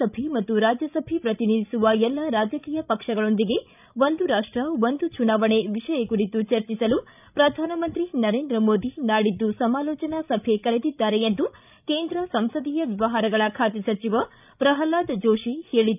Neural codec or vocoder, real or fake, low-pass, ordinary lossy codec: codec, 16 kHz, 16 kbps, FreqCodec, larger model; fake; 3.6 kHz; none